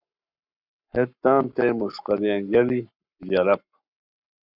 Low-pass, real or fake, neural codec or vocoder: 5.4 kHz; fake; codec, 44.1 kHz, 7.8 kbps, Pupu-Codec